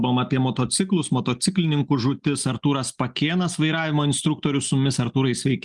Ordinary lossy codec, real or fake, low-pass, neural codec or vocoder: Opus, 32 kbps; real; 10.8 kHz; none